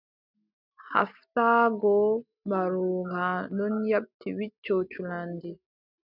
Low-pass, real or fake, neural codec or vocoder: 5.4 kHz; real; none